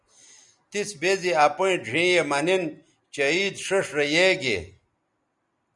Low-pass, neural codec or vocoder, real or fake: 10.8 kHz; none; real